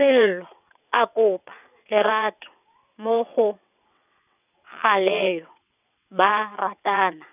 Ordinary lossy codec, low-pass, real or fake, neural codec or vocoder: none; 3.6 kHz; fake; vocoder, 22.05 kHz, 80 mel bands, WaveNeXt